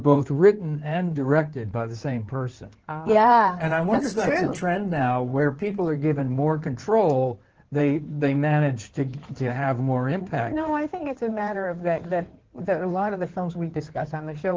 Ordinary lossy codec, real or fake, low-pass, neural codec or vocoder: Opus, 16 kbps; fake; 7.2 kHz; codec, 16 kHz in and 24 kHz out, 2.2 kbps, FireRedTTS-2 codec